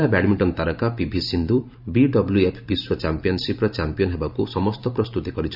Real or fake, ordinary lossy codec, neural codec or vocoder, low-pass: fake; none; vocoder, 44.1 kHz, 128 mel bands every 512 samples, BigVGAN v2; 5.4 kHz